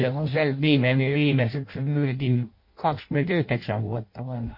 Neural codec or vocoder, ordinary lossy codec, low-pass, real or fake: codec, 16 kHz in and 24 kHz out, 0.6 kbps, FireRedTTS-2 codec; MP3, 32 kbps; 5.4 kHz; fake